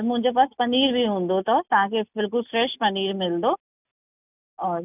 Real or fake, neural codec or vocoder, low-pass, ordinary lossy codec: real; none; 3.6 kHz; none